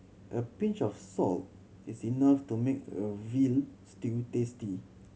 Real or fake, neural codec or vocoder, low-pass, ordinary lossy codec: real; none; none; none